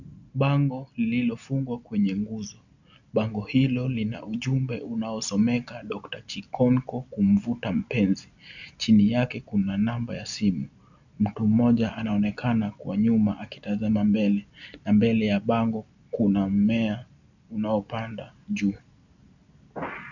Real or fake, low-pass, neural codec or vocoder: real; 7.2 kHz; none